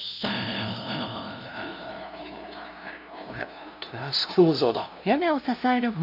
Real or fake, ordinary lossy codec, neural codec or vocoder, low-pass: fake; none; codec, 16 kHz, 0.5 kbps, FunCodec, trained on LibriTTS, 25 frames a second; 5.4 kHz